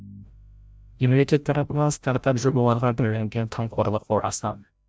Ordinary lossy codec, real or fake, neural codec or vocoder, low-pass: none; fake; codec, 16 kHz, 0.5 kbps, FreqCodec, larger model; none